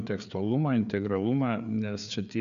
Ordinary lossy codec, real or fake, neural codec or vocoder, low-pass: MP3, 96 kbps; fake; codec, 16 kHz, 4 kbps, FreqCodec, larger model; 7.2 kHz